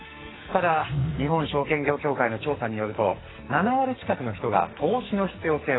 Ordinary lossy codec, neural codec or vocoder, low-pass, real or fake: AAC, 16 kbps; codec, 44.1 kHz, 2.6 kbps, SNAC; 7.2 kHz; fake